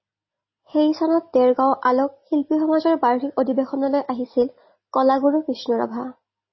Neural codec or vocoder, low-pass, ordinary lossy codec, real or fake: none; 7.2 kHz; MP3, 24 kbps; real